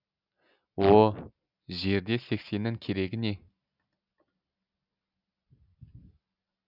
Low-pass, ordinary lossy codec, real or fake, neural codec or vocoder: 5.4 kHz; none; real; none